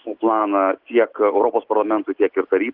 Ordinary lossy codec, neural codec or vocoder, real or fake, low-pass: Opus, 16 kbps; none; real; 5.4 kHz